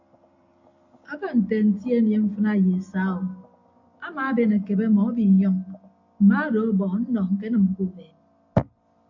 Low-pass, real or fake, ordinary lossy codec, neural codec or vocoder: 7.2 kHz; real; AAC, 48 kbps; none